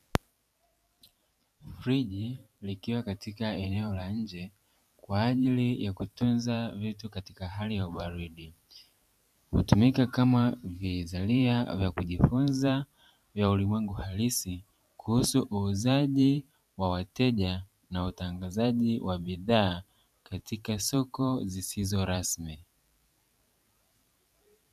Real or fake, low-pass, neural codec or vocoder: fake; 14.4 kHz; vocoder, 44.1 kHz, 128 mel bands every 512 samples, BigVGAN v2